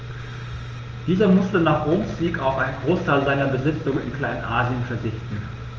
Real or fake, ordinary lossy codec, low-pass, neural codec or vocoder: real; Opus, 24 kbps; 7.2 kHz; none